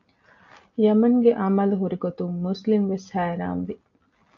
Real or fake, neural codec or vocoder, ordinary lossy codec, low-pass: real; none; AAC, 64 kbps; 7.2 kHz